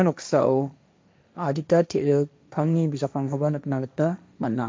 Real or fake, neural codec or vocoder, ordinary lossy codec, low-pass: fake; codec, 16 kHz, 1.1 kbps, Voila-Tokenizer; none; none